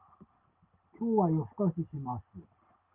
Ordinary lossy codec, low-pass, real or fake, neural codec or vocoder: Opus, 24 kbps; 3.6 kHz; real; none